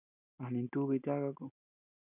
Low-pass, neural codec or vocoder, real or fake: 3.6 kHz; none; real